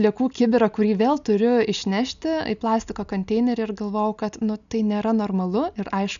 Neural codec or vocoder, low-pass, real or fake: none; 7.2 kHz; real